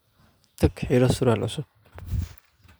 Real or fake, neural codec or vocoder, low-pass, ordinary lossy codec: fake; vocoder, 44.1 kHz, 128 mel bands every 512 samples, BigVGAN v2; none; none